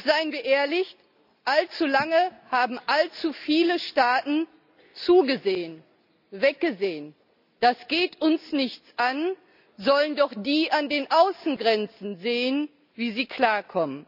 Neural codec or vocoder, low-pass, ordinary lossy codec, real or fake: none; 5.4 kHz; none; real